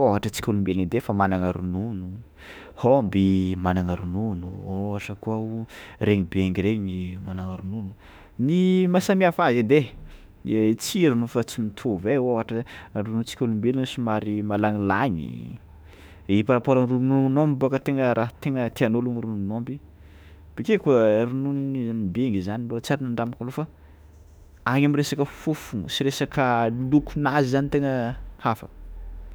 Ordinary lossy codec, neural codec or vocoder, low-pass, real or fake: none; autoencoder, 48 kHz, 32 numbers a frame, DAC-VAE, trained on Japanese speech; none; fake